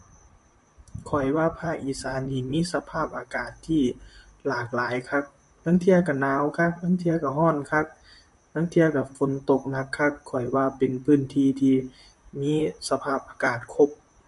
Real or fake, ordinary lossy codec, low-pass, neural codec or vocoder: fake; MP3, 48 kbps; 14.4 kHz; vocoder, 44.1 kHz, 128 mel bands, Pupu-Vocoder